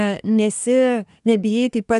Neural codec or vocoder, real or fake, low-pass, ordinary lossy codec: codec, 24 kHz, 1 kbps, SNAC; fake; 10.8 kHz; AAC, 96 kbps